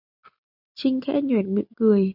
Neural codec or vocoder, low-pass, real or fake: none; 5.4 kHz; real